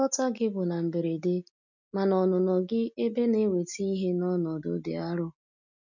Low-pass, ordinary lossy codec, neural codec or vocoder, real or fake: 7.2 kHz; none; none; real